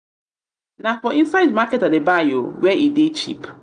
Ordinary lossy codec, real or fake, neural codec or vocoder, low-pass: none; real; none; 9.9 kHz